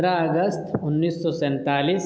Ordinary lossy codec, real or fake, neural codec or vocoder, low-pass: none; real; none; none